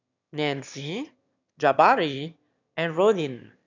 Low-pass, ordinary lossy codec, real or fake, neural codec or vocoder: 7.2 kHz; none; fake; autoencoder, 22.05 kHz, a latent of 192 numbers a frame, VITS, trained on one speaker